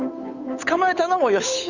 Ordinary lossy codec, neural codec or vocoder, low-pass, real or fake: none; codec, 16 kHz, 8 kbps, FunCodec, trained on Chinese and English, 25 frames a second; 7.2 kHz; fake